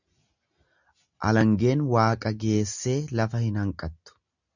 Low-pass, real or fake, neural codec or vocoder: 7.2 kHz; real; none